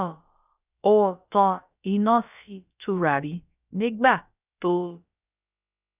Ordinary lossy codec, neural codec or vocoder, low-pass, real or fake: none; codec, 16 kHz, about 1 kbps, DyCAST, with the encoder's durations; 3.6 kHz; fake